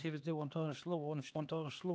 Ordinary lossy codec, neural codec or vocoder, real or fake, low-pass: none; codec, 16 kHz, 2 kbps, X-Codec, HuBERT features, trained on LibriSpeech; fake; none